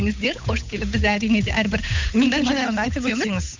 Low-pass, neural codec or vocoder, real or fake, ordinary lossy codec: 7.2 kHz; codec, 16 kHz, 8 kbps, FunCodec, trained on Chinese and English, 25 frames a second; fake; MP3, 64 kbps